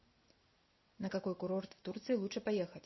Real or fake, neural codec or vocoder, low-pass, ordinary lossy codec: real; none; 7.2 kHz; MP3, 24 kbps